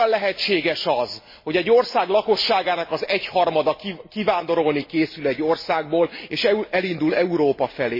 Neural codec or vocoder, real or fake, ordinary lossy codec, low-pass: none; real; MP3, 24 kbps; 5.4 kHz